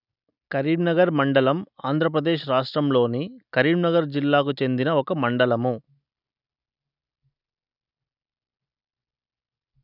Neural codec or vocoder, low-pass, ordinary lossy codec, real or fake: none; 5.4 kHz; none; real